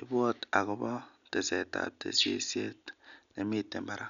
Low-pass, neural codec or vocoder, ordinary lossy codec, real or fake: 7.2 kHz; none; none; real